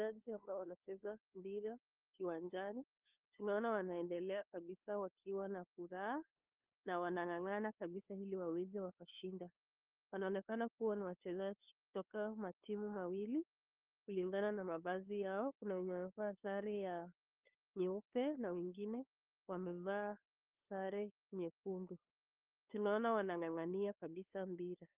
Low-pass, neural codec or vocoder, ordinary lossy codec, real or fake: 3.6 kHz; codec, 16 kHz, 2 kbps, FunCodec, trained on LibriTTS, 25 frames a second; Opus, 24 kbps; fake